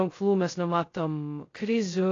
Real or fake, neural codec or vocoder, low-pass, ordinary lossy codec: fake; codec, 16 kHz, 0.2 kbps, FocalCodec; 7.2 kHz; AAC, 32 kbps